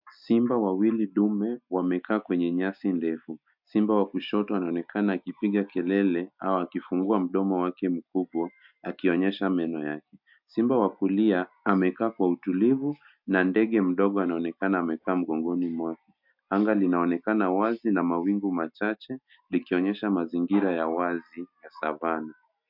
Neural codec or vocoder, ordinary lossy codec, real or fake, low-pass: none; MP3, 48 kbps; real; 5.4 kHz